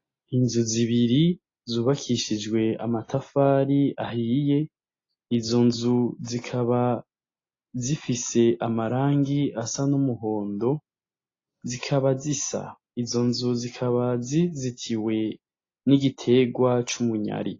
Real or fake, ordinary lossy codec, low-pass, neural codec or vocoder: real; AAC, 32 kbps; 7.2 kHz; none